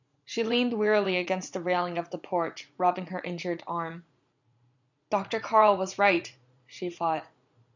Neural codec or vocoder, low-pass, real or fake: vocoder, 44.1 kHz, 80 mel bands, Vocos; 7.2 kHz; fake